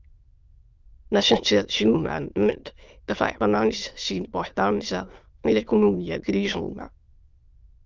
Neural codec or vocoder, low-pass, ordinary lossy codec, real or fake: autoencoder, 22.05 kHz, a latent of 192 numbers a frame, VITS, trained on many speakers; 7.2 kHz; Opus, 24 kbps; fake